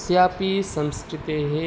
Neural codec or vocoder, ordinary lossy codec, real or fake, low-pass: none; none; real; none